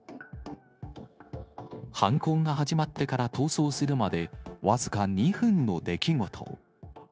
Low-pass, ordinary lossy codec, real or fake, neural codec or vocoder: none; none; fake; codec, 16 kHz, 0.9 kbps, LongCat-Audio-Codec